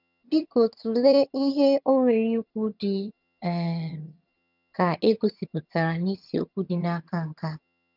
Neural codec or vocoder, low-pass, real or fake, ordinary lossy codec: vocoder, 22.05 kHz, 80 mel bands, HiFi-GAN; 5.4 kHz; fake; none